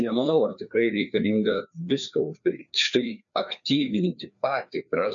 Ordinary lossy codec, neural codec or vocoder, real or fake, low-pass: MP3, 64 kbps; codec, 16 kHz, 2 kbps, FreqCodec, larger model; fake; 7.2 kHz